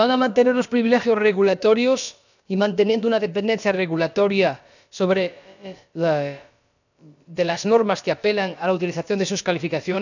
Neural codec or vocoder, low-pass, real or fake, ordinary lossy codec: codec, 16 kHz, about 1 kbps, DyCAST, with the encoder's durations; 7.2 kHz; fake; none